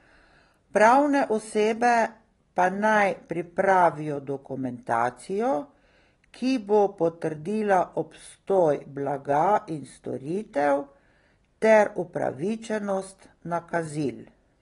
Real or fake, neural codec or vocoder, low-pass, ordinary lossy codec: real; none; 10.8 kHz; AAC, 32 kbps